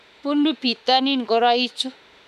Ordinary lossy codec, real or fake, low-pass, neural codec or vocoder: none; fake; 14.4 kHz; autoencoder, 48 kHz, 32 numbers a frame, DAC-VAE, trained on Japanese speech